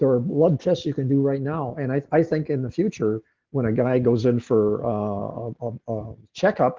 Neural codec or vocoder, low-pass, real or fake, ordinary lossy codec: none; 7.2 kHz; real; Opus, 16 kbps